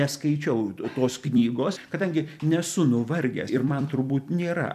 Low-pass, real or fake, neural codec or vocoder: 14.4 kHz; fake; vocoder, 44.1 kHz, 128 mel bands every 256 samples, BigVGAN v2